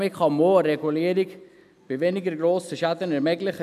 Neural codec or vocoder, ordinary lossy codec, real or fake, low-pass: none; none; real; 14.4 kHz